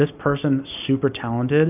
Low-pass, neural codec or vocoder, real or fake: 3.6 kHz; none; real